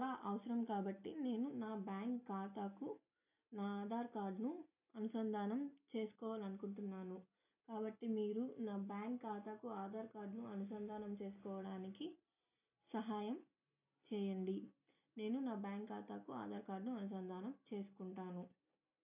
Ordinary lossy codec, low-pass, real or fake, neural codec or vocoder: none; 3.6 kHz; real; none